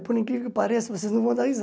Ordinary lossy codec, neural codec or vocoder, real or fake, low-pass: none; none; real; none